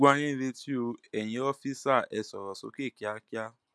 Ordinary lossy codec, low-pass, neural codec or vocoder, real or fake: none; none; none; real